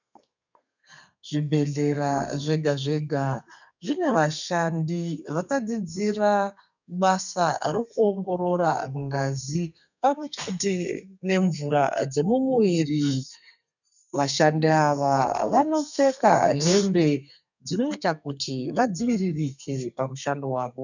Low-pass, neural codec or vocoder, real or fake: 7.2 kHz; codec, 32 kHz, 1.9 kbps, SNAC; fake